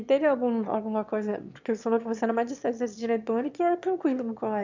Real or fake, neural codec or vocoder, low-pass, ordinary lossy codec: fake; autoencoder, 22.05 kHz, a latent of 192 numbers a frame, VITS, trained on one speaker; 7.2 kHz; MP3, 64 kbps